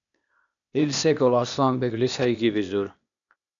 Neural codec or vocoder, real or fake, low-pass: codec, 16 kHz, 0.8 kbps, ZipCodec; fake; 7.2 kHz